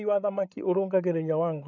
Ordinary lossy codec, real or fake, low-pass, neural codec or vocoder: none; fake; 7.2 kHz; codec, 16 kHz, 8 kbps, FreqCodec, larger model